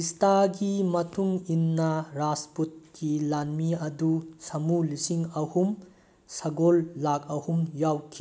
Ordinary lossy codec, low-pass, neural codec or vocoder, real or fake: none; none; none; real